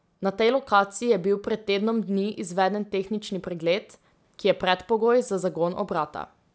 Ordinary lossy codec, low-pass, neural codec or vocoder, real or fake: none; none; none; real